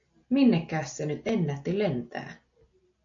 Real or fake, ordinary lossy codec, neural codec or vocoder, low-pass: real; AAC, 48 kbps; none; 7.2 kHz